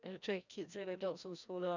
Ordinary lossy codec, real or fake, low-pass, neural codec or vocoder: none; fake; 7.2 kHz; codec, 16 kHz, 1 kbps, FreqCodec, larger model